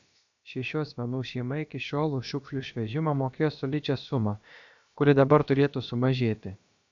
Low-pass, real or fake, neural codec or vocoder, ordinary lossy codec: 7.2 kHz; fake; codec, 16 kHz, about 1 kbps, DyCAST, with the encoder's durations; AAC, 64 kbps